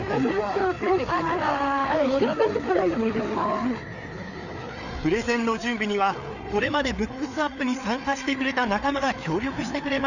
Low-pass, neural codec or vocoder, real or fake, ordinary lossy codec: 7.2 kHz; codec, 16 kHz, 4 kbps, FreqCodec, larger model; fake; none